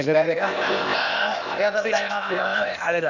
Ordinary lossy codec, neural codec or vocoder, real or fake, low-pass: none; codec, 16 kHz, 0.8 kbps, ZipCodec; fake; 7.2 kHz